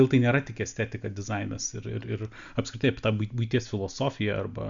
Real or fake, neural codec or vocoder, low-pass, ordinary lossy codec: real; none; 7.2 kHz; MP3, 64 kbps